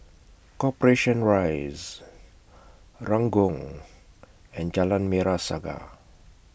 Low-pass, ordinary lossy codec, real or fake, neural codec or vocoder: none; none; real; none